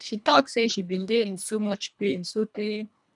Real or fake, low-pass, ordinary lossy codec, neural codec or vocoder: fake; 10.8 kHz; none; codec, 24 kHz, 1.5 kbps, HILCodec